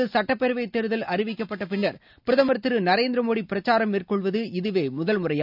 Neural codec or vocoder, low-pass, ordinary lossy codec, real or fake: none; 5.4 kHz; none; real